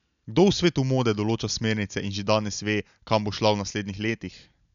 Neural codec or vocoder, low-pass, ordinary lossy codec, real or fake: none; 7.2 kHz; none; real